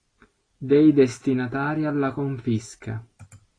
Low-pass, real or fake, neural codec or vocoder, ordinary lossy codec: 9.9 kHz; real; none; AAC, 32 kbps